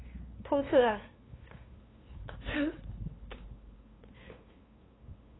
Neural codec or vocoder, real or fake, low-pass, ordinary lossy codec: codec, 16 kHz, 2 kbps, FunCodec, trained on LibriTTS, 25 frames a second; fake; 7.2 kHz; AAC, 16 kbps